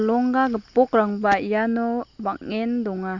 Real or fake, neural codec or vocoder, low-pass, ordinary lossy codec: real; none; 7.2 kHz; Opus, 64 kbps